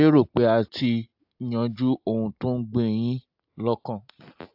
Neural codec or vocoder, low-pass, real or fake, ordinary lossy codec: none; 5.4 kHz; real; AAC, 48 kbps